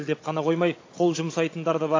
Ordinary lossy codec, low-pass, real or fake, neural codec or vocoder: AAC, 32 kbps; 7.2 kHz; fake; codec, 16 kHz, 16 kbps, FunCodec, trained on Chinese and English, 50 frames a second